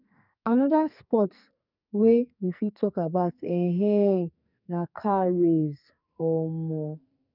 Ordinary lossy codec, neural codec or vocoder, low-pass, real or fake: none; codec, 44.1 kHz, 2.6 kbps, SNAC; 5.4 kHz; fake